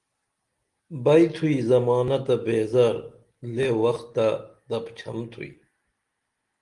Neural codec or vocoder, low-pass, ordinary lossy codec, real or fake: none; 10.8 kHz; Opus, 24 kbps; real